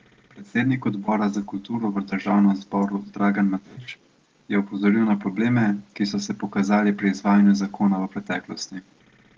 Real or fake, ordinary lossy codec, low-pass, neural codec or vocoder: real; Opus, 16 kbps; 7.2 kHz; none